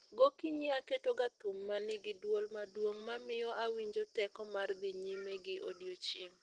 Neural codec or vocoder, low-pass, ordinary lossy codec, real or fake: none; 9.9 kHz; Opus, 16 kbps; real